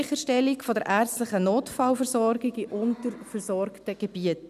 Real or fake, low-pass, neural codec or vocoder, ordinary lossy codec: real; 14.4 kHz; none; none